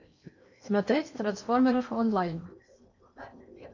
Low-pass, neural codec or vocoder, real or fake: 7.2 kHz; codec, 16 kHz in and 24 kHz out, 0.8 kbps, FocalCodec, streaming, 65536 codes; fake